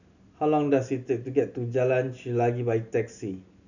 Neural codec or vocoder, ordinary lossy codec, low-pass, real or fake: none; none; 7.2 kHz; real